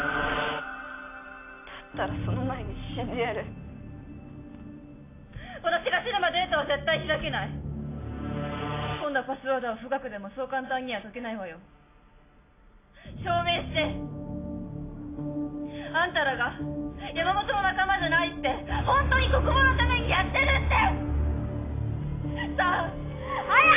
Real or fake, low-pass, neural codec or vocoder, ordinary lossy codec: real; 3.6 kHz; none; AAC, 24 kbps